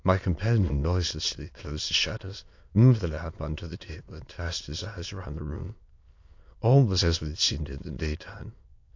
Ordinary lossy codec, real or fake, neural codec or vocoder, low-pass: AAC, 48 kbps; fake; autoencoder, 22.05 kHz, a latent of 192 numbers a frame, VITS, trained on many speakers; 7.2 kHz